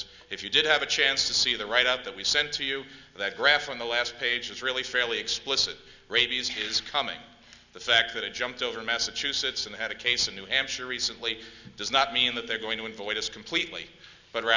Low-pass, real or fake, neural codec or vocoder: 7.2 kHz; real; none